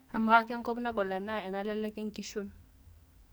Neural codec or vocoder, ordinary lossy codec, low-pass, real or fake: codec, 44.1 kHz, 2.6 kbps, SNAC; none; none; fake